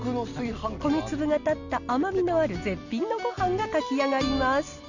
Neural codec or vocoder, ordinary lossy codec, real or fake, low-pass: none; none; real; 7.2 kHz